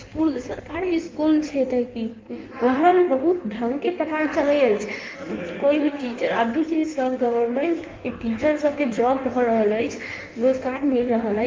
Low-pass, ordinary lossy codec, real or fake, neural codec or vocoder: 7.2 kHz; Opus, 32 kbps; fake; codec, 16 kHz in and 24 kHz out, 1.1 kbps, FireRedTTS-2 codec